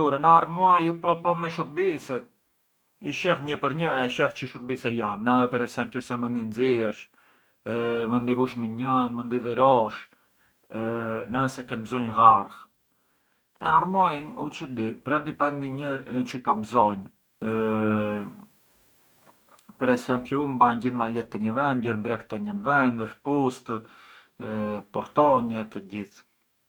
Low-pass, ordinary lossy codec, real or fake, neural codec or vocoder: none; none; fake; codec, 44.1 kHz, 2.6 kbps, DAC